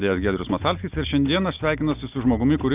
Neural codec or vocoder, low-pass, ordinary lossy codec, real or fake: none; 3.6 kHz; Opus, 32 kbps; real